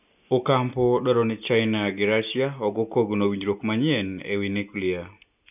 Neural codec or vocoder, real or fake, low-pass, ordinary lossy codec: none; real; 3.6 kHz; none